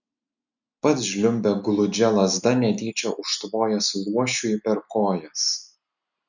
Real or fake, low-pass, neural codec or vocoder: real; 7.2 kHz; none